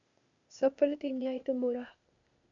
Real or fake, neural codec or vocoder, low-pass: fake; codec, 16 kHz, 0.8 kbps, ZipCodec; 7.2 kHz